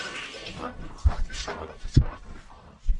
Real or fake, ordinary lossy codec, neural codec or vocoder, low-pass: fake; MP3, 64 kbps; codec, 44.1 kHz, 1.7 kbps, Pupu-Codec; 10.8 kHz